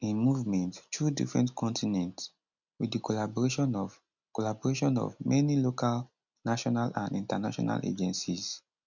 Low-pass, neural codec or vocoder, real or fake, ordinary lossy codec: 7.2 kHz; none; real; none